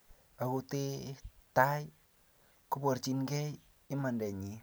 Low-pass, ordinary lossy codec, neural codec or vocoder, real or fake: none; none; none; real